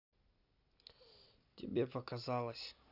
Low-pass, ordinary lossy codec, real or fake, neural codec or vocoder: 5.4 kHz; none; real; none